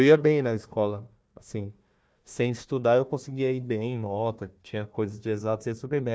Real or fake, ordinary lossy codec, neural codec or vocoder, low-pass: fake; none; codec, 16 kHz, 1 kbps, FunCodec, trained on Chinese and English, 50 frames a second; none